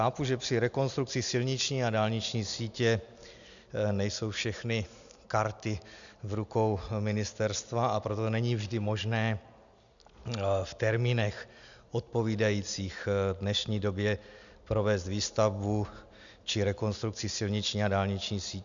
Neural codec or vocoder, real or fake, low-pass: none; real; 7.2 kHz